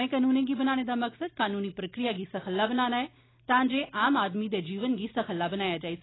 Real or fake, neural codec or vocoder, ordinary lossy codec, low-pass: real; none; AAC, 16 kbps; 7.2 kHz